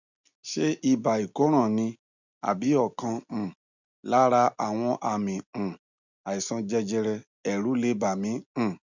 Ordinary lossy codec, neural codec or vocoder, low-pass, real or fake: none; none; 7.2 kHz; real